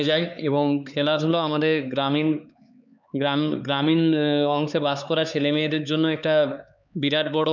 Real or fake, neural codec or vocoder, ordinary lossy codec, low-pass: fake; codec, 16 kHz, 4 kbps, X-Codec, HuBERT features, trained on LibriSpeech; none; 7.2 kHz